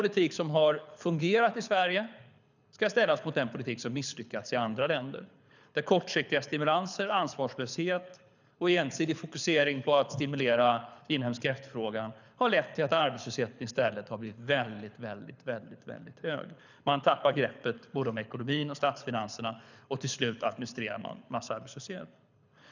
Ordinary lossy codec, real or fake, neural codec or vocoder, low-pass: none; fake; codec, 24 kHz, 6 kbps, HILCodec; 7.2 kHz